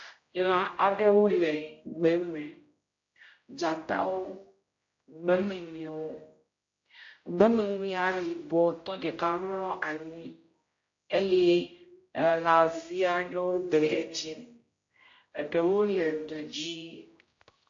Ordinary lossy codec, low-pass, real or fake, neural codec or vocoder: AAC, 48 kbps; 7.2 kHz; fake; codec, 16 kHz, 0.5 kbps, X-Codec, HuBERT features, trained on general audio